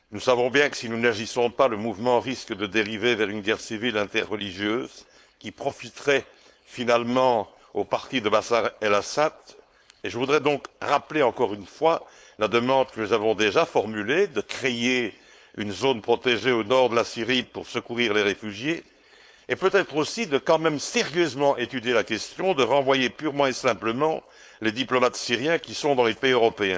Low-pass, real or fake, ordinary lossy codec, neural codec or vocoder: none; fake; none; codec, 16 kHz, 4.8 kbps, FACodec